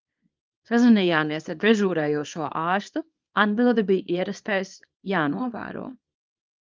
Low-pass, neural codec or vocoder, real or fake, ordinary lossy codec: 7.2 kHz; codec, 24 kHz, 0.9 kbps, WavTokenizer, small release; fake; Opus, 24 kbps